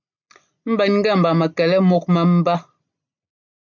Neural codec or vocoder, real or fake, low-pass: none; real; 7.2 kHz